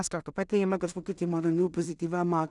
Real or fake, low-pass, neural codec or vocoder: fake; 10.8 kHz; codec, 16 kHz in and 24 kHz out, 0.4 kbps, LongCat-Audio-Codec, two codebook decoder